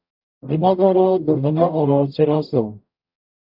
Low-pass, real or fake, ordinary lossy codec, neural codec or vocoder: 5.4 kHz; fake; AAC, 48 kbps; codec, 44.1 kHz, 0.9 kbps, DAC